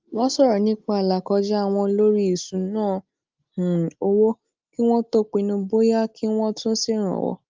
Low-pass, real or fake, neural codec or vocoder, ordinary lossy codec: 7.2 kHz; real; none; Opus, 24 kbps